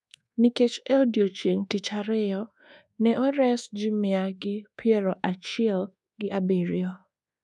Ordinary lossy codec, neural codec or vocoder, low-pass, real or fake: none; codec, 24 kHz, 1.2 kbps, DualCodec; none; fake